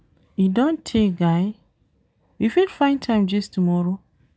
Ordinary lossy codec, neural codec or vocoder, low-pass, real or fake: none; none; none; real